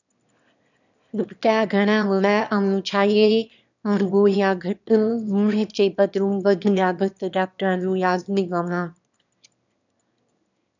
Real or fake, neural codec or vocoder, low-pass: fake; autoencoder, 22.05 kHz, a latent of 192 numbers a frame, VITS, trained on one speaker; 7.2 kHz